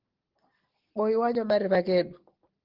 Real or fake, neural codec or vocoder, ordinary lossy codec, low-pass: real; none; Opus, 16 kbps; 5.4 kHz